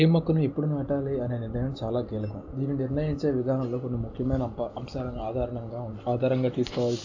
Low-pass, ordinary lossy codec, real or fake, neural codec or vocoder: 7.2 kHz; AAC, 48 kbps; real; none